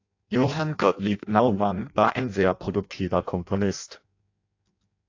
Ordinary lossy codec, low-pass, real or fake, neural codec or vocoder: AAC, 48 kbps; 7.2 kHz; fake; codec, 16 kHz in and 24 kHz out, 0.6 kbps, FireRedTTS-2 codec